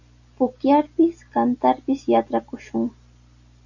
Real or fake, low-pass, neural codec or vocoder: real; 7.2 kHz; none